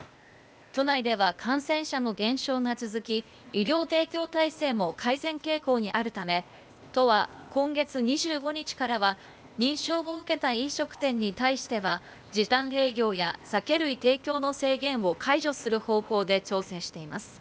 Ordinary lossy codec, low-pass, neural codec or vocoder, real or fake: none; none; codec, 16 kHz, 0.8 kbps, ZipCodec; fake